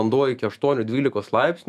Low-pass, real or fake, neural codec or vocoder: 14.4 kHz; fake; autoencoder, 48 kHz, 128 numbers a frame, DAC-VAE, trained on Japanese speech